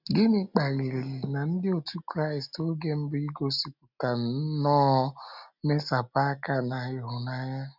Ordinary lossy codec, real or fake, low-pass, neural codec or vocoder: none; real; 5.4 kHz; none